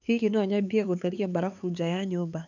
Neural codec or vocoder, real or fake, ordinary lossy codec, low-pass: codec, 16 kHz, 4 kbps, X-Codec, HuBERT features, trained on LibriSpeech; fake; none; 7.2 kHz